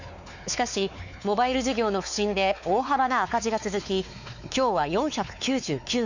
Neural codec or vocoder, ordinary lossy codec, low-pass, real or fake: codec, 16 kHz, 4 kbps, X-Codec, WavLM features, trained on Multilingual LibriSpeech; none; 7.2 kHz; fake